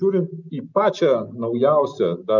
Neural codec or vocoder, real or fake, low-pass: none; real; 7.2 kHz